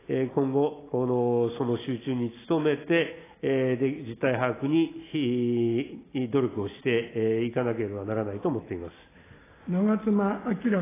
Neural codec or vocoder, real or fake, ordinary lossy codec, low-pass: none; real; AAC, 16 kbps; 3.6 kHz